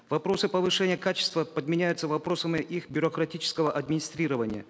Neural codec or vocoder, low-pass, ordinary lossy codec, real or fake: none; none; none; real